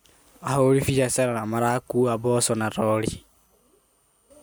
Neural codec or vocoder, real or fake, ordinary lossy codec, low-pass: none; real; none; none